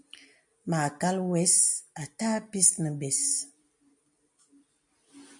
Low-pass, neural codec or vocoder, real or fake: 10.8 kHz; none; real